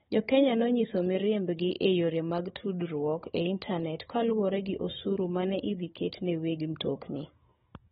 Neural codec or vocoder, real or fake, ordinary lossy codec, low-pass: codec, 16 kHz, 16 kbps, FunCodec, trained on Chinese and English, 50 frames a second; fake; AAC, 16 kbps; 7.2 kHz